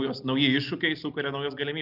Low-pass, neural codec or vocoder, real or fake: 5.4 kHz; none; real